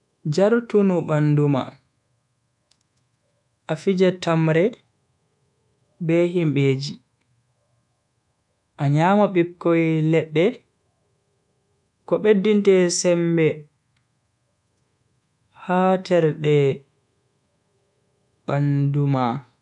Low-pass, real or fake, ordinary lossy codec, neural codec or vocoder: 10.8 kHz; fake; none; codec, 24 kHz, 1.2 kbps, DualCodec